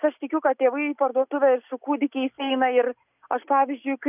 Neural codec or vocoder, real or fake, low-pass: none; real; 3.6 kHz